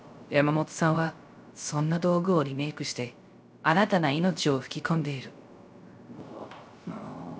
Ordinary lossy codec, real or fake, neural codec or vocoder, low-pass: none; fake; codec, 16 kHz, 0.3 kbps, FocalCodec; none